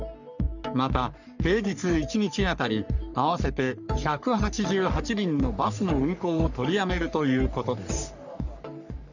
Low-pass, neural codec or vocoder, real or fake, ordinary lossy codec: 7.2 kHz; codec, 44.1 kHz, 3.4 kbps, Pupu-Codec; fake; none